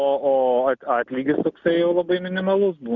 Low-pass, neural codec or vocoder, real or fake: 7.2 kHz; none; real